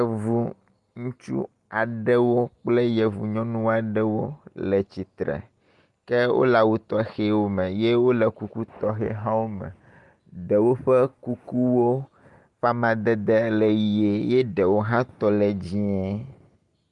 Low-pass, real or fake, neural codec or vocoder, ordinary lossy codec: 10.8 kHz; real; none; Opus, 32 kbps